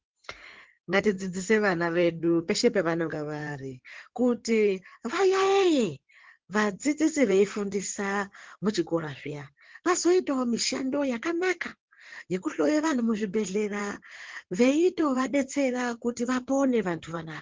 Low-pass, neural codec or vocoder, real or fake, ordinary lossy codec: 7.2 kHz; codec, 16 kHz in and 24 kHz out, 2.2 kbps, FireRedTTS-2 codec; fake; Opus, 16 kbps